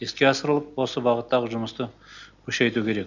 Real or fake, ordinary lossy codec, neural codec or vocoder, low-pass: real; none; none; 7.2 kHz